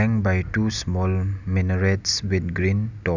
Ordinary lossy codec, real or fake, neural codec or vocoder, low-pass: none; real; none; 7.2 kHz